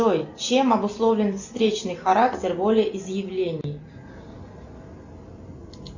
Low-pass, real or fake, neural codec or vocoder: 7.2 kHz; real; none